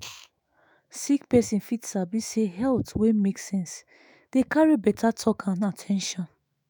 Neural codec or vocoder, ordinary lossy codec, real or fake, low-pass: autoencoder, 48 kHz, 128 numbers a frame, DAC-VAE, trained on Japanese speech; none; fake; none